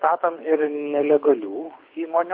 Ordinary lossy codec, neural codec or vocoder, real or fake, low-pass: MP3, 32 kbps; codec, 24 kHz, 6 kbps, HILCodec; fake; 5.4 kHz